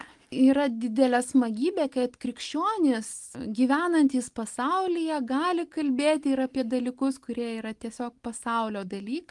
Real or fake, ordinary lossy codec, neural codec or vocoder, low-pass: real; Opus, 24 kbps; none; 10.8 kHz